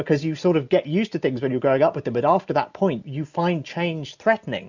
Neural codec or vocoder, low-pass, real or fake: none; 7.2 kHz; real